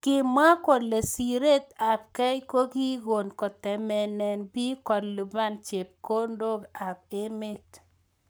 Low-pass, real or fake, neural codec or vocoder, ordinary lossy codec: none; fake; codec, 44.1 kHz, 7.8 kbps, Pupu-Codec; none